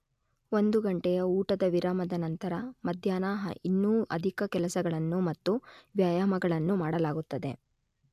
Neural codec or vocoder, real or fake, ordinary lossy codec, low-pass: none; real; AAC, 96 kbps; 14.4 kHz